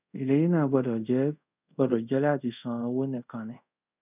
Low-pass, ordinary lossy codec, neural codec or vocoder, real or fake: 3.6 kHz; none; codec, 24 kHz, 0.5 kbps, DualCodec; fake